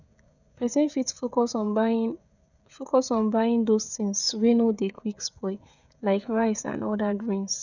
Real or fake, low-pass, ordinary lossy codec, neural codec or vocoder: fake; 7.2 kHz; none; codec, 16 kHz, 16 kbps, FreqCodec, smaller model